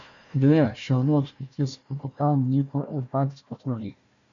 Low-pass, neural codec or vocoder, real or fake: 7.2 kHz; codec, 16 kHz, 1 kbps, FunCodec, trained on Chinese and English, 50 frames a second; fake